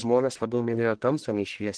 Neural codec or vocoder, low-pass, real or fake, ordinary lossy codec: codec, 44.1 kHz, 1.7 kbps, Pupu-Codec; 9.9 kHz; fake; Opus, 16 kbps